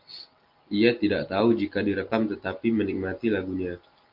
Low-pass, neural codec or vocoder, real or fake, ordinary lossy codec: 5.4 kHz; none; real; Opus, 32 kbps